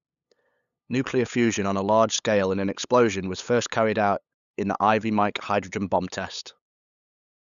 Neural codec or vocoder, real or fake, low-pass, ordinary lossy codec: codec, 16 kHz, 8 kbps, FunCodec, trained on LibriTTS, 25 frames a second; fake; 7.2 kHz; none